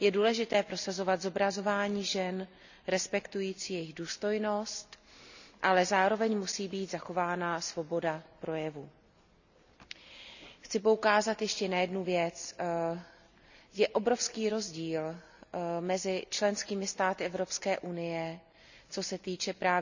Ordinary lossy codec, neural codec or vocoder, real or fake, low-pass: none; none; real; 7.2 kHz